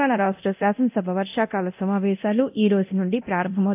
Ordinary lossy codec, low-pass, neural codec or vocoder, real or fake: AAC, 32 kbps; 3.6 kHz; codec, 24 kHz, 0.9 kbps, DualCodec; fake